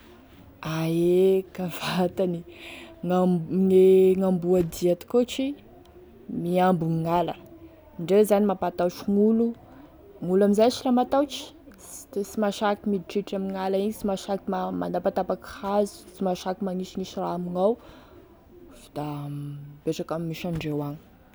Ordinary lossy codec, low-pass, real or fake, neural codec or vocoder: none; none; real; none